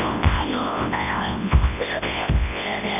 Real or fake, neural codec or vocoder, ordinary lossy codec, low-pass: fake; codec, 24 kHz, 0.9 kbps, WavTokenizer, large speech release; none; 3.6 kHz